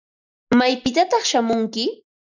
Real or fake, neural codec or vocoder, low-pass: real; none; 7.2 kHz